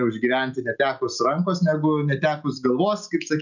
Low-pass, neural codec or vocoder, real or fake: 7.2 kHz; none; real